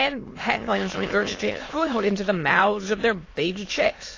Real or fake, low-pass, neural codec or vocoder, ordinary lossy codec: fake; 7.2 kHz; autoencoder, 22.05 kHz, a latent of 192 numbers a frame, VITS, trained on many speakers; AAC, 32 kbps